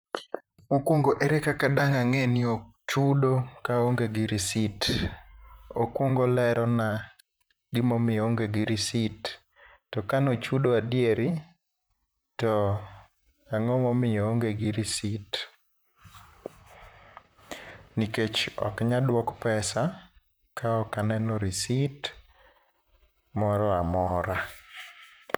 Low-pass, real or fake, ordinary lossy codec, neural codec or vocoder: none; fake; none; vocoder, 44.1 kHz, 128 mel bands, Pupu-Vocoder